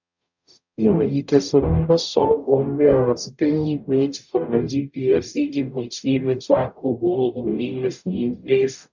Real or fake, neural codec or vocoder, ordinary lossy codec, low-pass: fake; codec, 44.1 kHz, 0.9 kbps, DAC; none; 7.2 kHz